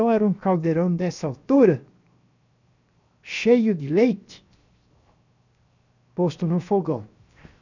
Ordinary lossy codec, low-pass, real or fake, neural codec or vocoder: none; 7.2 kHz; fake; codec, 16 kHz, 0.7 kbps, FocalCodec